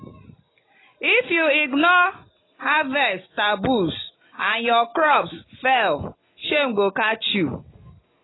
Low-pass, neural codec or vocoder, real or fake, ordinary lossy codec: 7.2 kHz; none; real; AAC, 16 kbps